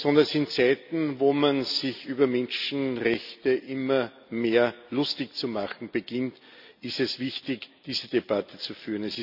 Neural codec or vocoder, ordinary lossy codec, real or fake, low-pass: none; none; real; 5.4 kHz